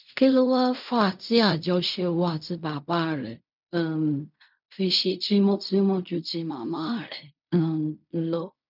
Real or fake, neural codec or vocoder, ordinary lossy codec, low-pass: fake; codec, 16 kHz in and 24 kHz out, 0.4 kbps, LongCat-Audio-Codec, fine tuned four codebook decoder; none; 5.4 kHz